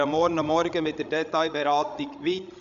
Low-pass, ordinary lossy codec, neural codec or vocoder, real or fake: 7.2 kHz; none; codec, 16 kHz, 16 kbps, FreqCodec, larger model; fake